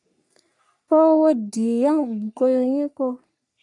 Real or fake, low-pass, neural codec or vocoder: fake; 10.8 kHz; codec, 44.1 kHz, 3.4 kbps, Pupu-Codec